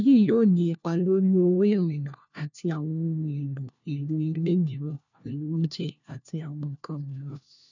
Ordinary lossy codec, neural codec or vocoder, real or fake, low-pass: none; codec, 16 kHz, 1 kbps, FunCodec, trained on LibriTTS, 50 frames a second; fake; 7.2 kHz